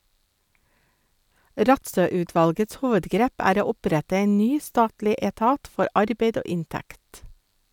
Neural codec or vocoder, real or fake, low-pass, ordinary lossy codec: none; real; 19.8 kHz; none